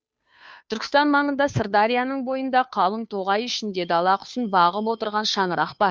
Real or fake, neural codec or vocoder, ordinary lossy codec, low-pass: fake; codec, 16 kHz, 2 kbps, FunCodec, trained on Chinese and English, 25 frames a second; none; none